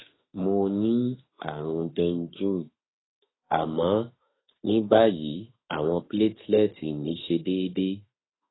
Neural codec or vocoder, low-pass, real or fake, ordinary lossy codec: autoencoder, 48 kHz, 32 numbers a frame, DAC-VAE, trained on Japanese speech; 7.2 kHz; fake; AAC, 16 kbps